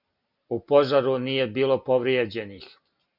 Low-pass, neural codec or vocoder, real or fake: 5.4 kHz; none; real